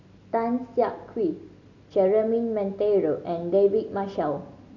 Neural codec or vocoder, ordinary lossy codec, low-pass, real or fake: none; none; 7.2 kHz; real